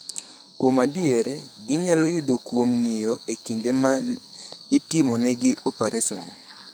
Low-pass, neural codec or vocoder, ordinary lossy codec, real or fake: none; codec, 44.1 kHz, 2.6 kbps, SNAC; none; fake